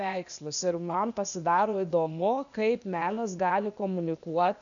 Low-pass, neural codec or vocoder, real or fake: 7.2 kHz; codec, 16 kHz, 0.8 kbps, ZipCodec; fake